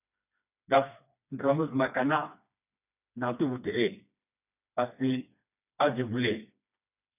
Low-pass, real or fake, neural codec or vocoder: 3.6 kHz; fake; codec, 16 kHz, 2 kbps, FreqCodec, smaller model